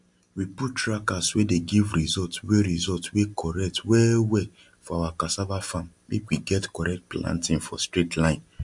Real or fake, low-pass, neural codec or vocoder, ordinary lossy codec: real; 10.8 kHz; none; MP3, 64 kbps